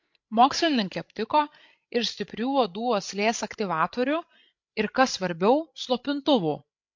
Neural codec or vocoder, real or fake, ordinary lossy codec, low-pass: codec, 16 kHz, 16 kbps, FreqCodec, larger model; fake; MP3, 48 kbps; 7.2 kHz